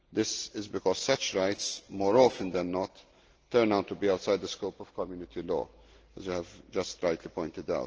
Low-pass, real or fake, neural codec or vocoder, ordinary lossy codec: 7.2 kHz; real; none; Opus, 16 kbps